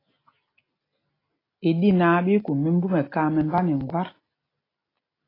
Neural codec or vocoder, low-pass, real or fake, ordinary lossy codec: none; 5.4 kHz; real; AAC, 24 kbps